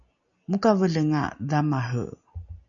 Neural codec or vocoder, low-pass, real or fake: none; 7.2 kHz; real